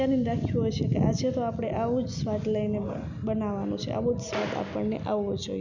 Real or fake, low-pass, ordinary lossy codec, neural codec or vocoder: real; 7.2 kHz; none; none